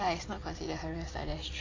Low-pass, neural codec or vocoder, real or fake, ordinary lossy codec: 7.2 kHz; none; real; AAC, 32 kbps